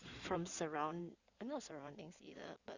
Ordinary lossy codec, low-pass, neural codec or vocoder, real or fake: Opus, 64 kbps; 7.2 kHz; codec, 16 kHz in and 24 kHz out, 2.2 kbps, FireRedTTS-2 codec; fake